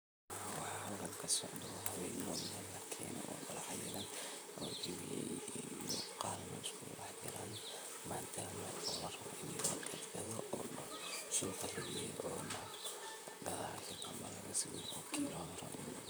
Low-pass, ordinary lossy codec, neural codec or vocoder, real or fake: none; none; vocoder, 44.1 kHz, 128 mel bands, Pupu-Vocoder; fake